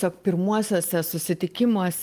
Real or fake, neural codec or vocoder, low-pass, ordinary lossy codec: real; none; 14.4 kHz; Opus, 24 kbps